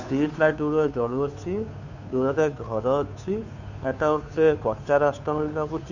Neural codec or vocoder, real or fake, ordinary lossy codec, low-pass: codec, 16 kHz, 2 kbps, FunCodec, trained on Chinese and English, 25 frames a second; fake; none; 7.2 kHz